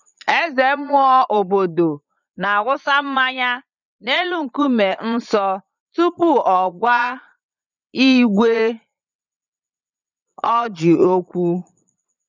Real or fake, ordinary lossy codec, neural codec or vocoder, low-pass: fake; none; vocoder, 22.05 kHz, 80 mel bands, Vocos; 7.2 kHz